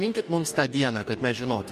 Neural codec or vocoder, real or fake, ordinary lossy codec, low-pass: codec, 44.1 kHz, 2.6 kbps, DAC; fake; MP3, 64 kbps; 14.4 kHz